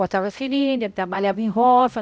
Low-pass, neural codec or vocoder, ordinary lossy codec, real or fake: none; codec, 16 kHz, 0.5 kbps, X-Codec, HuBERT features, trained on balanced general audio; none; fake